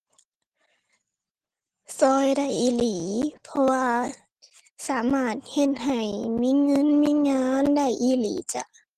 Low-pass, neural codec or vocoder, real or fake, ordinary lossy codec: 14.4 kHz; none; real; Opus, 16 kbps